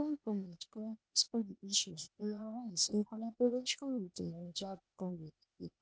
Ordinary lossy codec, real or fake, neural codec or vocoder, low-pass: none; fake; codec, 16 kHz, 0.8 kbps, ZipCodec; none